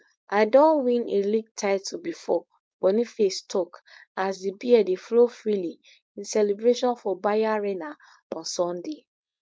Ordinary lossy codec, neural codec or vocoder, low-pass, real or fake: none; codec, 16 kHz, 4.8 kbps, FACodec; none; fake